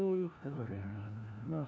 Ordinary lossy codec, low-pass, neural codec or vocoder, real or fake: none; none; codec, 16 kHz, 1 kbps, FunCodec, trained on LibriTTS, 50 frames a second; fake